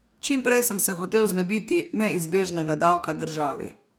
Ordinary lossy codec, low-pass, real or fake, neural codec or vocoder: none; none; fake; codec, 44.1 kHz, 2.6 kbps, DAC